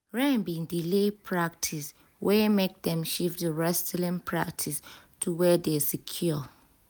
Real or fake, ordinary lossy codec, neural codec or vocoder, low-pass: real; none; none; none